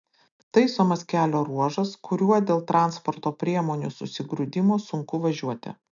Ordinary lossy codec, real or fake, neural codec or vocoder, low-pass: AAC, 64 kbps; real; none; 7.2 kHz